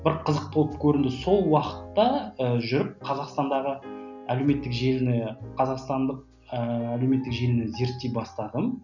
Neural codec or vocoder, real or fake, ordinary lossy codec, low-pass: none; real; none; 7.2 kHz